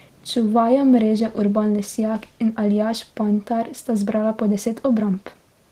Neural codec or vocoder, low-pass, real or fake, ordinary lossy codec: none; 14.4 kHz; real; Opus, 16 kbps